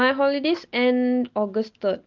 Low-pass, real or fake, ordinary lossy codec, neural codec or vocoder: 7.2 kHz; real; Opus, 24 kbps; none